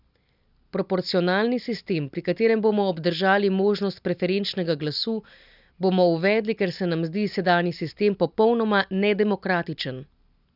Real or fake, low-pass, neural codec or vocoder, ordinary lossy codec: real; 5.4 kHz; none; none